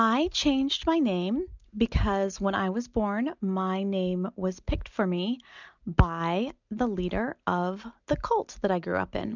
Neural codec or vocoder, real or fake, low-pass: none; real; 7.2 kHz